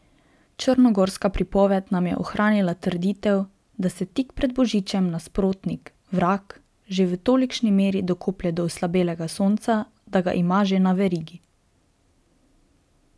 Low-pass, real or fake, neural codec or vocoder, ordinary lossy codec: none; real; none; none